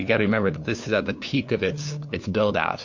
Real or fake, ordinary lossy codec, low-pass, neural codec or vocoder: fake; MP3, 48 kbps; 7.2 kHz; codec, 16 kHz, 2 kbps, FunCodec, trained on LibriTTS, 25 frames a second